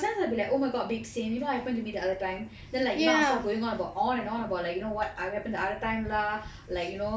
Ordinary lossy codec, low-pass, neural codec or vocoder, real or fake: none; none; none; real